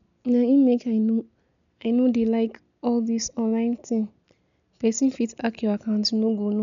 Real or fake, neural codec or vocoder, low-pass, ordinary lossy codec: real; none; 7.2 kHz; none